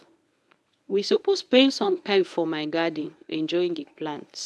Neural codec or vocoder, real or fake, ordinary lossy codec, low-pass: codec, 24 kHz, 0.9 kbps, WavTokenizer, medium speech release version 1; fake; none; none